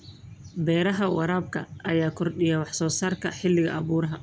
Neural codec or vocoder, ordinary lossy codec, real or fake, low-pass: none; none; real; none